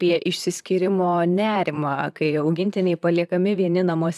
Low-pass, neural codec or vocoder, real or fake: 14.4 kHz; vocoder, 44.1 kHz, 128 mel bands, Pupu-Vocoder; fake